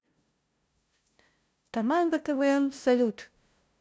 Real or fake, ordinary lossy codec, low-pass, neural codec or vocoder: fake; none; none; codec, 16 kHz, 0.5 kbps, FunCodec, trained on LibriTTS, 25 frames a second